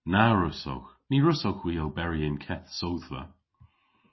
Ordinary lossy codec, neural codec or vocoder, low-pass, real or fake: MP3, 24 kbps; none; 7.2 kHz; real